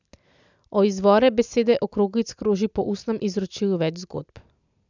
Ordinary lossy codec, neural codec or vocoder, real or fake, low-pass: none; none; real; 7.2 kHz